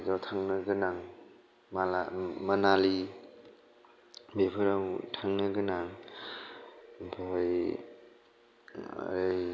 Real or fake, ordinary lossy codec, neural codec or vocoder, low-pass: real; none; none; none